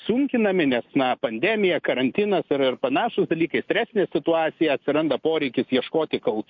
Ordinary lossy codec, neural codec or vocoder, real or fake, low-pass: MP3, 48 kbps; none; real; 7.2 kHz